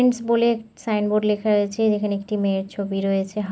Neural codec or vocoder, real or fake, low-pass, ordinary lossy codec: none; real; none; none